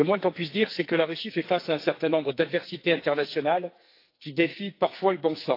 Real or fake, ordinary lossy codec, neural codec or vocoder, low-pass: fake; AAC, 32 kbps; codec, 44.1 kHz, 2.6 kbps, SNAC; 5.4 kHz